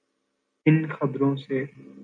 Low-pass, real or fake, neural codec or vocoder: 9.9 kHz; real; none